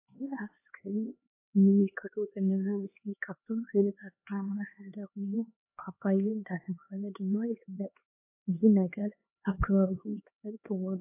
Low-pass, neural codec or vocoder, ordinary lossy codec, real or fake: 3.6 kHz; codec, 16 kHz, 2 kbps, X-Codec, HuBERT features, trained on LibriSpeech; AAC, 24 kbps; fake